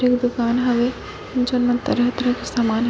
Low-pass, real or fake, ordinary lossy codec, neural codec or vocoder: none; real; none; none